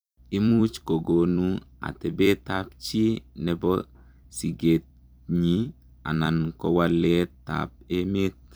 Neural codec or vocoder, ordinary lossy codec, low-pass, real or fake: vocoder, 44.1 kHz, 128 mel bands every 256 samples, BigVGAN v2; none; none; fake